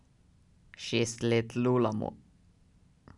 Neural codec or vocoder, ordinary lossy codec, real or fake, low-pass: none; none; real; 10.8 kHz